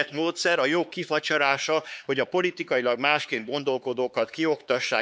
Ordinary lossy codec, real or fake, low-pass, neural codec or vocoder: none; fake; none; codec, 16 kHz, 4 kbps, X-Codec, HuBERT features, trained on LibriSpeech